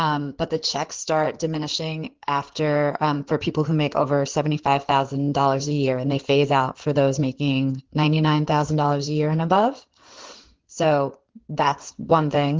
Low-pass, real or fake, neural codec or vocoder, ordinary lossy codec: 7.2 kHz; fake; codec, 16 kHz in and 24 kHz out, 2.2 kbps, FireRedTTS-2 codec; Opus, 16 kbps